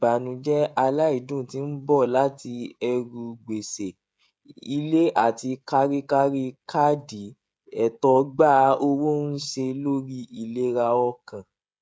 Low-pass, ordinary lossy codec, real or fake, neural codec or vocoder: none; none; fake; codec, 16 kHz, 16 kbps, FreqCodec, smaller model